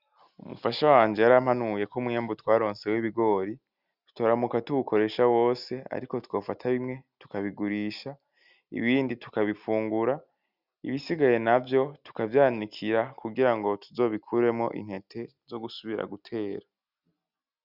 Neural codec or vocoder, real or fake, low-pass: none; real; 5.4 kHz